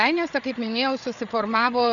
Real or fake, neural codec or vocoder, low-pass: fake; codec, 16 kHz, 8 kbps, FreqCodec, larger model; 7.2 kHz